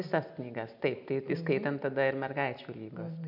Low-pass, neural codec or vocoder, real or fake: 5.4 kHz; none; real